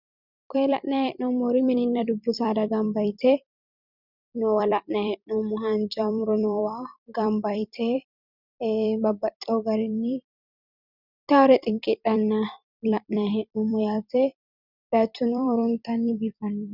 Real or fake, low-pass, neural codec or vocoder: fake; 5.4 kHz; vocoder, 44.1 kHz, 128 mel bands every 256 samples, BigVGAN v2